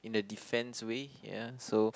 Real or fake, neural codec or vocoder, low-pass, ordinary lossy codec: real; none; none; none